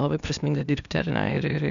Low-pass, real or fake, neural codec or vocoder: 7.2 kHz; fake; codec, 16 kHz, 0.8 kbps, ZipCodec